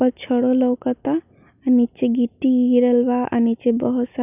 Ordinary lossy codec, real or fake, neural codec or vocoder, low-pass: none; real; none; 3.6 kHz